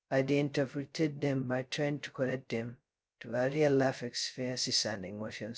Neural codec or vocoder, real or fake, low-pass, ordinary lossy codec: codec, 16 kHz, 0.2 kbps, FocalCodec; fake; none; none